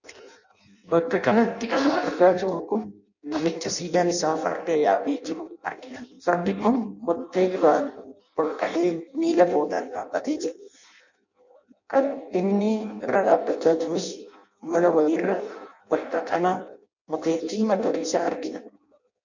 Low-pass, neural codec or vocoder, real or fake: 7.2 kHz; codec, 16 kHz in and 24 kHz out, 0.6 kbps, FireRedTTS-2 codec; fake